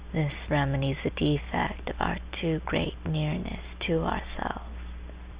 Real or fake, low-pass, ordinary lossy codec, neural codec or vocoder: real; 3.6 kHz; Opus, 64 kbps; none